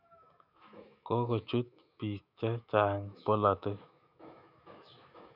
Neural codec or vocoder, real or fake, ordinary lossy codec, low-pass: autoencoder, 48 kHz, 128 numbers a frame, DAC-VAE, trained on Japanese speech; fake; none; 5.4 kHz